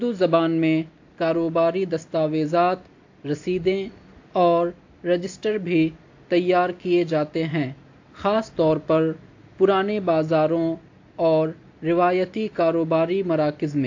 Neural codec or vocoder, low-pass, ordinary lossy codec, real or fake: none; 7.2 kHz; AAC, 48 kbps; real